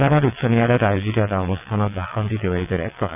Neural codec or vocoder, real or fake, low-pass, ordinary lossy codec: vocoder, 22.05 kHz, 80 mel bands, WaveNeXt; fake; 3.6 kHz; none